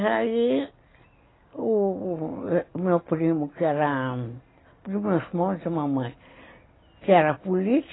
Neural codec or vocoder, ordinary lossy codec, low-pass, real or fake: none; AAC, 16 kbps; 7.2 kHz; real